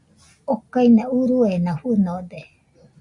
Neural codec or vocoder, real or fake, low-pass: none; real; 10.8 kHz